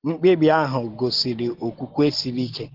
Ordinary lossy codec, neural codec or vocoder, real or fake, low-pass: Opus, 16 kbps; none; real; 5.4 kHz